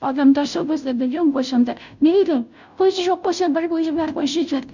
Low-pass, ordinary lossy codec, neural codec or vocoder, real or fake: 7.2 kHz; none; codec, 16 kHz, 0.5 kbps, FunCodec, trained on Chinese and English, 25 frames a second; fake